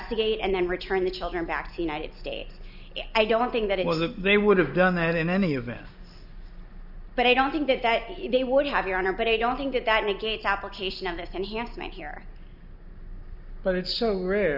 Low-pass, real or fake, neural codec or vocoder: 5.4 kHz; real; none